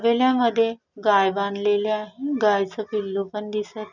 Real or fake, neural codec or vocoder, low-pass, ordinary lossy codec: real; none; 7.2 kHz; none